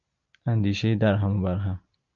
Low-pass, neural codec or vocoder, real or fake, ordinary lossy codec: 7.2 kHz; none; real; MP3, 48 kbps